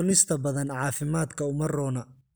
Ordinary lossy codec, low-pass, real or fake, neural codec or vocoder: none; none; real; none